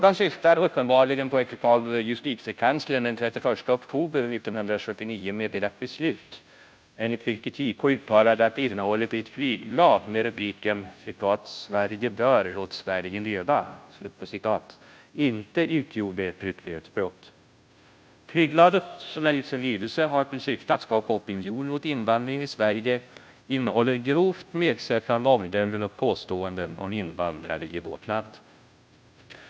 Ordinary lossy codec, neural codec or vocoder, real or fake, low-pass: none; codec, 16 kHz, 0.5 kbps, FunCodec, trained on Chinese and English, 25 frames a second; fake; none